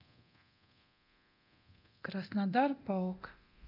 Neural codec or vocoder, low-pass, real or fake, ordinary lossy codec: codec, 24 kHz, 0.9 kbps, DualCodec; 5.4 kHz; fake; none